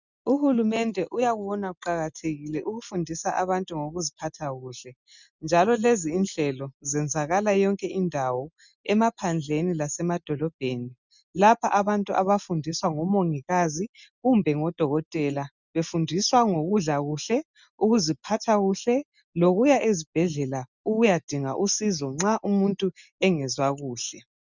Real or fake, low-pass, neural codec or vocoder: real; 7.2 kHz; none